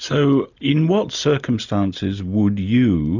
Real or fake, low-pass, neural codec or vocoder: real; 7.2 kHz; none